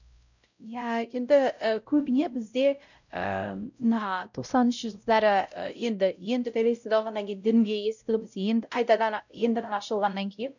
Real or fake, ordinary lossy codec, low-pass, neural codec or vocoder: fake; none; 7.2 kHz; codec, 16 kHz, 0.5 kbps, X-Codec, WavLM features, trained on Multilingual LibriSpeech